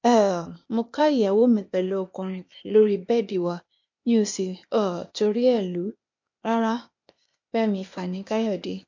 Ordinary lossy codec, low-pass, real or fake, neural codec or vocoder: MP3, 48 kbps; 7.2 kHz; fake; codec, 16 kHz, 0.8 kbps, ZipCodec